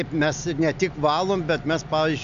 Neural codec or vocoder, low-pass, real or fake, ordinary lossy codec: none; 7.2 kHz; real; MP3, 64 kbps